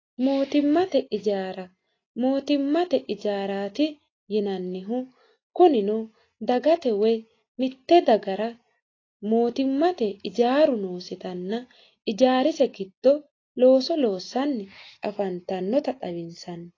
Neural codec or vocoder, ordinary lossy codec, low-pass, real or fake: none; AAC, 32 kbps; 7.2 kHz; real